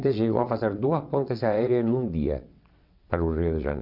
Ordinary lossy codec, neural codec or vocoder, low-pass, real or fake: none; vocoder, 22.05 kHz, 80 mel bands, WaveNeXt; 5.4 kHz; fake